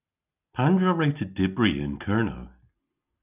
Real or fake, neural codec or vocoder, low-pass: real; none; 3.6 kHz